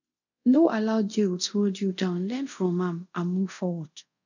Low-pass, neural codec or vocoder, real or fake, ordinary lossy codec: 7.2 kHz; codec, 24 kHz, 0.5 kbps, DualCodec; fake; none